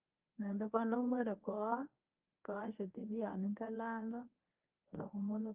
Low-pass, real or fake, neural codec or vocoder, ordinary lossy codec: 3.6 kHz; fake; codec, 24 kHz, 0.9 kbps, WavTokenizer, medium speech release version 1; Opus, 24 kbps